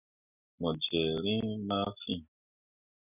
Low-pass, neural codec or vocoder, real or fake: 3.6 kHz; none; real